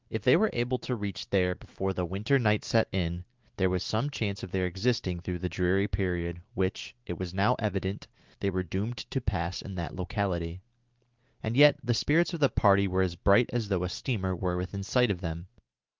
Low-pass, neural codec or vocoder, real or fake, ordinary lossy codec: 7.2 kHz; none; real; Opus, 32 kbps